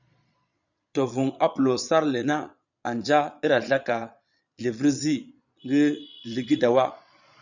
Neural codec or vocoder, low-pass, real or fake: vocoder, 22.05 kHz, 80 mel bands, Vocos; 7.2 kHz; fake